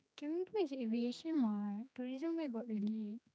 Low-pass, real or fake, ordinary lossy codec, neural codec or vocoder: none; fake; none; codec, 16 kHz, 2 kbps, X-Codec, HuBERT features, trained on general audio